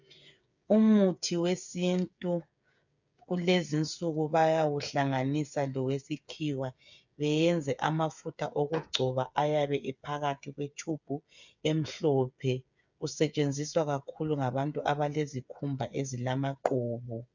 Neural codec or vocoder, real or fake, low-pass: codec, 16 kHz, 8 kbps, FreqCodec, smaller model; fake; 7.2 kHz